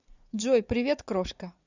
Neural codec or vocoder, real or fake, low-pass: vocoder, 44.1 kHz, 80 mel bands, Vocos; fake; 7.2 kHz